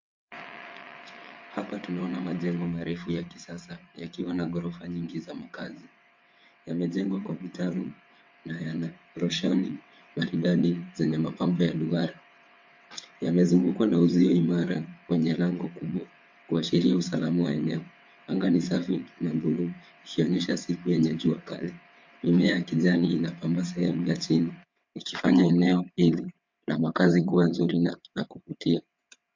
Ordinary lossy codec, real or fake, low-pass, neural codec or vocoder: MP3, 48 kbps; fake; 7.2 kHz; vocoder, 22.05 kHz, 80 mel bands, WaveNeXt